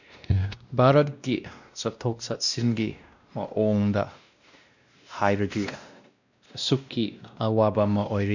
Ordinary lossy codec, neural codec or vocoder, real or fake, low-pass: none; codec, 16 kHz, 1 kbps, X-Codec, WavLM features, trained on Multilingual LibriSpeech; fake; 7.2 kHz